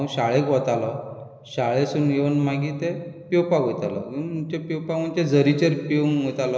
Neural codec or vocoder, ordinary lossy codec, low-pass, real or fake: none; none; none; real